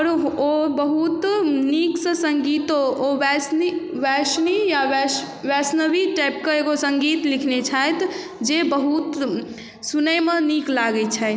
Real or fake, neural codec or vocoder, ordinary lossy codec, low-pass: real; none; none; none